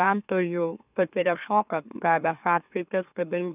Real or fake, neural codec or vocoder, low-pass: fake; autoencoder, 44.1 kHz, a latent of 192 numbers a frame, MeloTTS; 3.6 kHz